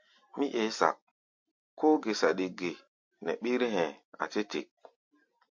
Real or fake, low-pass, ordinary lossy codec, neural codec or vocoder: real; 7.2 kHz; MP3, 48 kbps; none